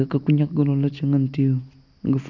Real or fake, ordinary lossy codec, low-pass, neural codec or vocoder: real; none; 7.2 kHz; none